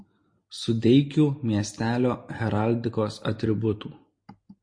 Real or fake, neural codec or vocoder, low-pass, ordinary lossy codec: real; none; 9.9 kHz; MP3, 48 kbps